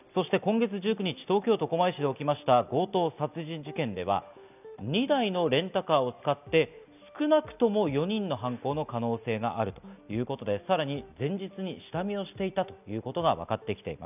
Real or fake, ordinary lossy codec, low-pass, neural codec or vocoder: real; none; 3.6 kHz; none